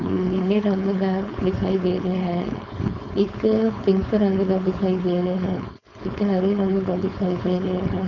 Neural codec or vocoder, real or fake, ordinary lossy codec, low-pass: codec, 16 kHz, 4.8 kbps, FACodec; fake; none; 7.2 kHz